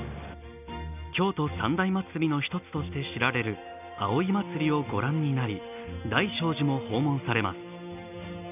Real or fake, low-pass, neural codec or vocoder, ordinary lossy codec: real; 3.6 kHz; none; none